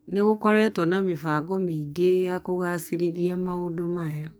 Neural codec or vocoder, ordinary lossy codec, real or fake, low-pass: codec, 44.1 kHz, 2.6 kbps, SNAC; none; fake; none